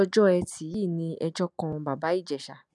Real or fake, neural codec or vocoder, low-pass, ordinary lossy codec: real; none; none; none